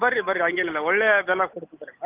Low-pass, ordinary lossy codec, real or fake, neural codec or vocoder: 3.6 kHz; Opus, 24 kbps; real; none